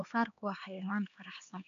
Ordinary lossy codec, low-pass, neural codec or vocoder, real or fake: none; 7.2 kHz; codec, 16 kHz, 2 kbps, X-Codec, HuBERT features, trained on LibriSpeech; fake